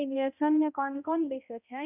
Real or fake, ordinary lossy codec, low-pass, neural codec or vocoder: fake; none; 3.6 kHz; codec, 16 kHz, 0.5 kbps, X-Codec, HuBERT features, trained on balanced general audio